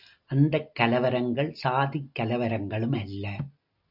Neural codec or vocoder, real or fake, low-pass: none; real; 5.4 kHz